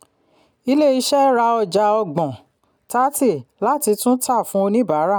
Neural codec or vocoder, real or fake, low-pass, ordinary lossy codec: none; real; none; none